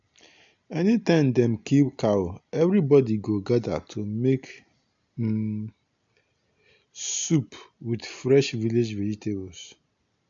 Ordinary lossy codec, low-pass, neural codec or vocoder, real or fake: MP3, 64 kbps; 7.2 kHz; none; real